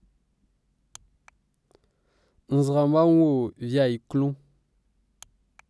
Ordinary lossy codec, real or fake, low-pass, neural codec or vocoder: none; real; none; none